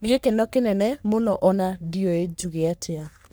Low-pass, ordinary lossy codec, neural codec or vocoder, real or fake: none; none; codec, 44.1 kHz, 3.4 kbps, Pupu-Codec; fake